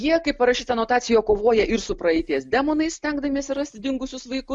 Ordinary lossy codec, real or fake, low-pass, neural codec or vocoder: MP3, 96 kbps; real; 10.8 kHz; none